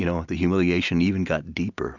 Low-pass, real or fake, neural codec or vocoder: 7.2 kHz; real; none